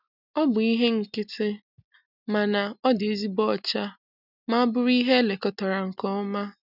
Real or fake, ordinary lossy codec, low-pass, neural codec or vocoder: real; none; 5.4 kHz; none